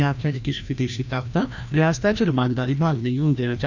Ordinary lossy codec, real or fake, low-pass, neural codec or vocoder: none; fake; 7.2 kHz; codec, 16 kHz, 1 kbps, FreqCodec, larger model